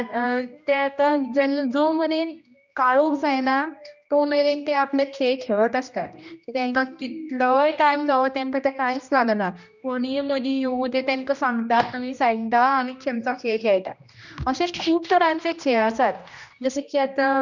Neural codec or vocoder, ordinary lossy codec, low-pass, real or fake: codec, 16 kHz, 1 kbps, X-Codec, HuBERT features, trained on general audio; none; 7.2 kHz; fake